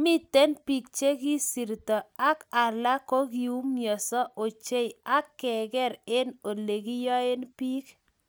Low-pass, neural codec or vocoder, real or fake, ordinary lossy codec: none; none; real; none